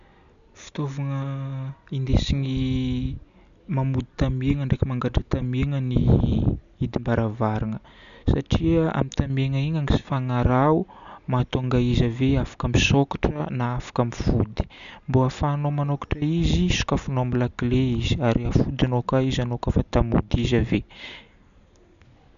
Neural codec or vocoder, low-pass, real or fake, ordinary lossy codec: none; 7.2 kHz; real; none